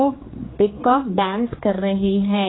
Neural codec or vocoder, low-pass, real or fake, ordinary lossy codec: codec, 16 kHz, 4 kbps, X-Codec, HuBERT features, trained on general audio; 7.2 kHz; fake; AAC, 16 kbps